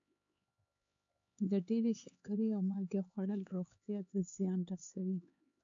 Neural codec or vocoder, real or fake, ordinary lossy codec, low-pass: codec, 16 kHz, 4 kbps, X-Codec, HuBERT features, trained on LibriSpeech; fake; AAC, 64 kbps; 7.2 kHz